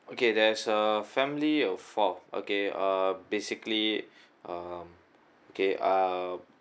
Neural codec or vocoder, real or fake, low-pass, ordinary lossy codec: none; real; none; none